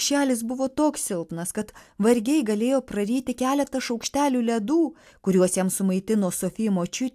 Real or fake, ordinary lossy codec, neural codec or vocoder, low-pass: real; AAC, 96 kbps; none; 14.4 kHz